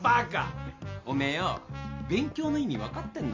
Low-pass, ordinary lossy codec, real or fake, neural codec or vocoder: 7.2 kHz; MP3, 48 kbps; real; none